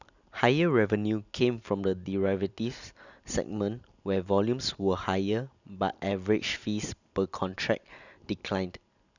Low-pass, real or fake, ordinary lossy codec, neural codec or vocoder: 7.2 kHz; real; none; none